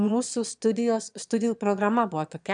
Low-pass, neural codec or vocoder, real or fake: 9.9 kHz; autoencoder, 22.05 kHz, a latent of 192 numbers a frame, VITS, trained on one speaker; fake